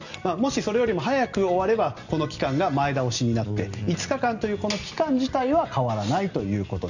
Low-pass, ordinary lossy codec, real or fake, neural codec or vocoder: 7.2 kHz; none; real; none